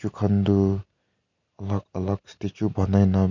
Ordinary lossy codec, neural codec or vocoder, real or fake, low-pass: none; none; real; 7.2 kHz